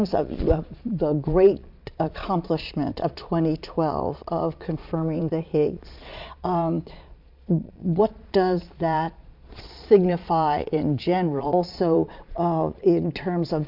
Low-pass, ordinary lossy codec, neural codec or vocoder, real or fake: 5.4 kHz; MP3, 48 kbps; vocoder, 22.05 kHz, 80 mel bands, Vocos; fake